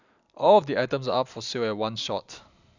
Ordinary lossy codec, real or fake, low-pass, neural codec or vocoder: none; real; 7.2 kHz; none